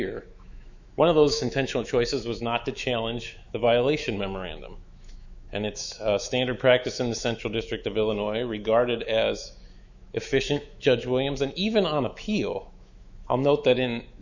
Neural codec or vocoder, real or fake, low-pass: autoencoder, 48 kHz, 128 numbers a frame, DAC-VAE, trained on Japanese speech; fake; 7.2 kHz